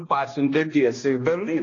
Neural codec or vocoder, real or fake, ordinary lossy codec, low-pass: codec, 16 kHz, 1 kbps, X-Codec, HuBERT features, trained on balanced general audio; fake; AAC, 32 kbps; 7.2 kHz